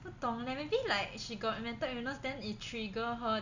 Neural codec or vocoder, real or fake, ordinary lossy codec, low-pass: none; real; none; 7.2 kHz